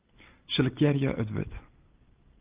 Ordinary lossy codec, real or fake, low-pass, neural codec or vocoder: Opus, 24 kbps; real; 3.6 kHz; none